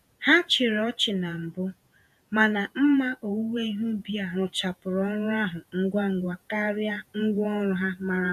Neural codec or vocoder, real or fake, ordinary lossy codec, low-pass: vocoder, 48 kHz, 128 mel bands, Vocos; fake; none; 14.4 kHz